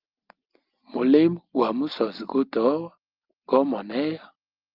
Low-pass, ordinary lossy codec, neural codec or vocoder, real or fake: 5.4 kHz; Opus, 32 kbps; none; real